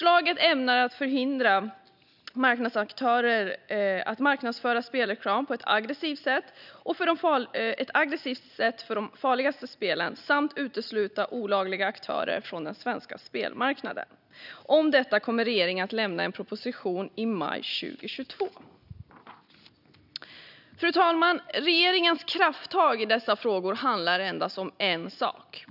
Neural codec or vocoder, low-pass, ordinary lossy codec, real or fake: none; 5.4 kHz; none; real